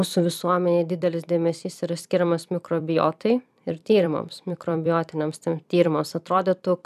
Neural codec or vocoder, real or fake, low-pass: vocoder, 44.1 kHz, 128 mel bands every 256 samples, BigVGAN v2; fake; 14.4 kHz